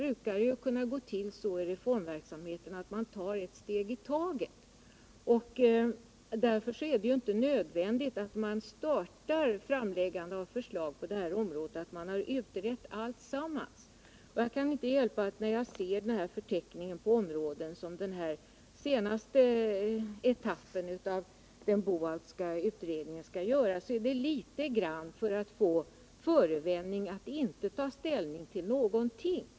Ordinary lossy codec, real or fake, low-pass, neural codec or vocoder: none; real; none; none